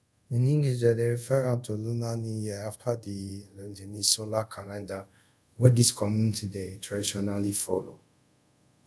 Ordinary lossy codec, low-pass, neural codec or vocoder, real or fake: none; none; codec, 24 kHz, 0.5 kbps, DualCodec; fake